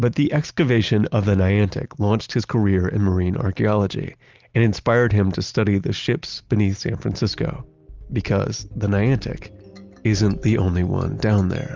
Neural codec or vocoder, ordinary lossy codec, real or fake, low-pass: none; Opus, 32 kbps; real; 7.2 kHz